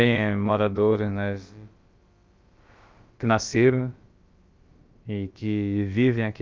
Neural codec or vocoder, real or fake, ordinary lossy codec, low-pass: codec, 16 kHz, about 1 kbps, DyCAST, with the encoder's durations; fake; Opus, 32 kbps; 7.2 kHz